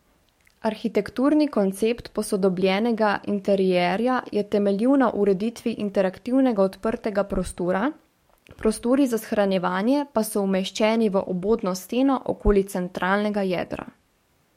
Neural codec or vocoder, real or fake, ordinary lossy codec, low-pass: codec, 44.1 kHz, 7.8 kbps, DAC; fake; MP3, 64 kbps; 19.8 kHz